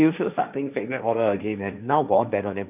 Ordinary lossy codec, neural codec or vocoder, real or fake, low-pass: none; codec, 16 kHz, 1.1 kbps, Voila-Tokenizer; fake; 3.6 kHz